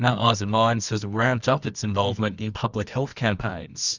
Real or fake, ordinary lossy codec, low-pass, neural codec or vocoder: fake; Opus, 64 kbps; 7.2 kHz; codec, 24 kHz, 0.9 kbps, WavTokenizer, medium music audio release